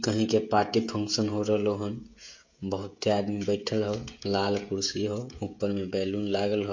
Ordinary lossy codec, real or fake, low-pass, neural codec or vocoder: MP3, 48 kbps; fake; 7.2 kHz; codec, 16 kHz, 16 kbps, FreqCodec, smaller model